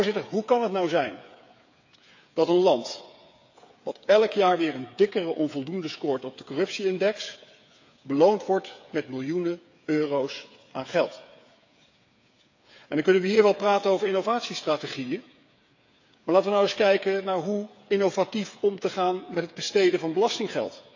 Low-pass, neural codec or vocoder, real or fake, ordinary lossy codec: 7.2 kHz; codec, 16 kHz, 8 kbps, FreqCodec, smaller model; fake; AAC, 48 kbps